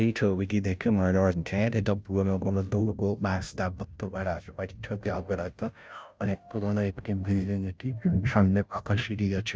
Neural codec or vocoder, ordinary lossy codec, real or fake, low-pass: codec, 16 kHz, 0.5 kbps, FunCodec, trained on Chinese and English, 25 frames a second; none; fake; none